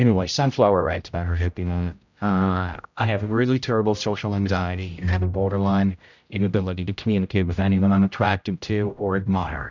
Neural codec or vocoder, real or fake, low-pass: codec, 16 kHz, 0.5 kbps, X-Codec, HuBERT features, trained on general audio; fake; 7.2 kHz